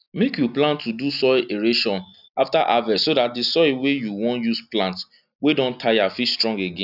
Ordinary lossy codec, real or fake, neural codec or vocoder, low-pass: none; real; none; 5.4 kHz